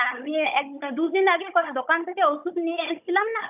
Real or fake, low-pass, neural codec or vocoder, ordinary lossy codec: fake; 3.6 kHz; codec, 16 kHz, 16 kbps, FunCodec, trained on Chinese and English, 50 frames a second; none